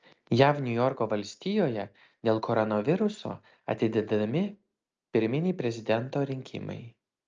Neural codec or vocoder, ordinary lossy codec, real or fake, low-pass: none; Opus, 24 kbps; real; 7.2 kHz